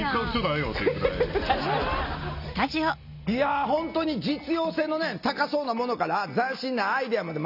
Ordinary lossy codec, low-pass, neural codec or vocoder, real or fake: none; 5.4 kHz; none; real